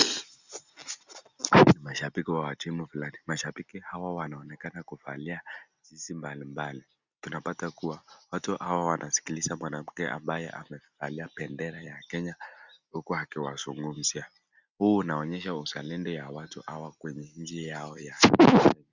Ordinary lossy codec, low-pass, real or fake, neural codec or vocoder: Opus, 64 kbps; 7.2 kHz; real; none